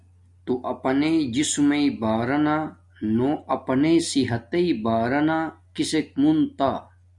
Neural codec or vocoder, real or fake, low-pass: none; real; 10.8 kHz